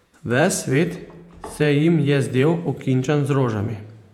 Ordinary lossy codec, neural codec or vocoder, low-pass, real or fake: MP3, 96 kbps; vocoder, 48 kHz, 128 mel bands, Vocos; 19.8 kHz; fake